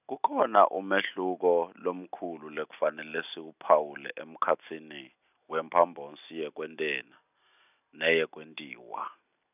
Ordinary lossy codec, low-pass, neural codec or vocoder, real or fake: none; 3.6 kHz; none; real